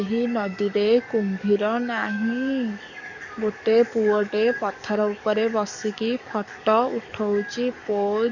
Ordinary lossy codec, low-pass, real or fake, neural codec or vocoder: none; 7.2 kHz; fake; codec, 44.1 kHz, 7.8 kbps, DAC